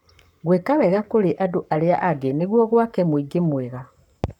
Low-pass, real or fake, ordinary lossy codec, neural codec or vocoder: 19.8 kHz; fake; none; codec, 44.1 kHz, 7.8 kbps, Pupu-Codec